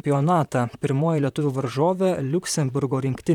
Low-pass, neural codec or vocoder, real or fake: 19.8 kHz; vocoder, 44.1 kHz, 128 mel bands, Pupu-Vocoder; fake